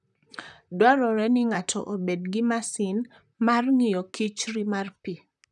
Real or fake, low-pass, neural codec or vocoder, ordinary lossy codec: fake; 10.8 kHz; vocoder, 44.1 kHz, 128 mel bands, Pupu-Vocoder; none